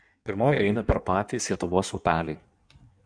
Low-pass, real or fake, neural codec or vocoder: 9.9 kHz; fake; codec, 16 kHz in and 24 kHz out, 1.1 kbps, FireRedTTS-2 codec